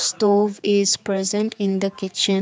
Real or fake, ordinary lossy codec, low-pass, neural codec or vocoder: fake; none; none; codec, 16 kHz, 4 kbps, X-Codec, HuBERT features, trained on general audio